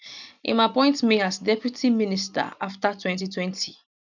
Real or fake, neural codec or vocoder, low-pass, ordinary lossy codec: real; none; 7.2 kHz; none